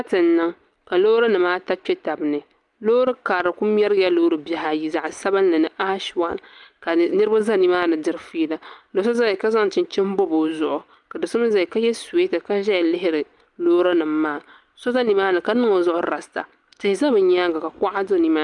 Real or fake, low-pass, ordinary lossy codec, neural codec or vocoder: real; 10.8 kHz; Opus, 32 kbps; none